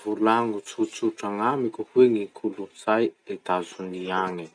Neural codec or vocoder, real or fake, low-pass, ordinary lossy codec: none; real; 9.9 kHz; none